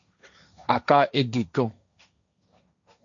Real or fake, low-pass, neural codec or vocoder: fake; 7.2 kHz; codec, 16 kHz, 1.1 kbps, Voila-Tokenizer